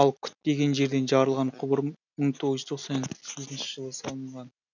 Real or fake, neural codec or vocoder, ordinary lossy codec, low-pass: real; none; none; 7.2 kHz